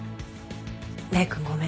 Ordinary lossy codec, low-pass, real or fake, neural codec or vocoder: none; none; real; none